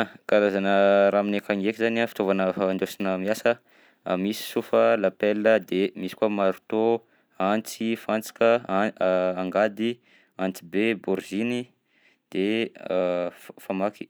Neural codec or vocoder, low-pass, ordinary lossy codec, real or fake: none; none; none; real